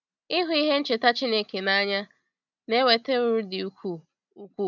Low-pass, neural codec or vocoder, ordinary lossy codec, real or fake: 7.2 kHz; none; none; real